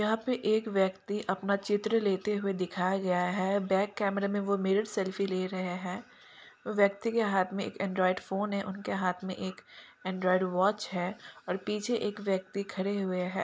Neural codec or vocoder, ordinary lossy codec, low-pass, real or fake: none; none; none; real